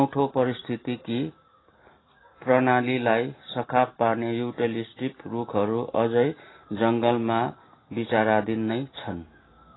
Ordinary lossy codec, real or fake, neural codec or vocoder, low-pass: AAC, 16 kbps; real; none; 7.2 kHz